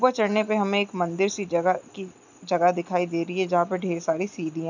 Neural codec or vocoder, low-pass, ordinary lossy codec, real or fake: none; 7.2 kHz; none; real